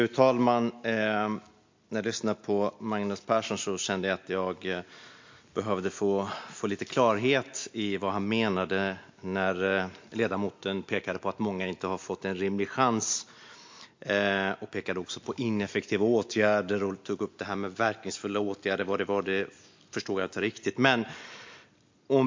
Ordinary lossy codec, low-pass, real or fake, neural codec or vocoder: MP3, 48 kbps; 7.2 kHz; real; none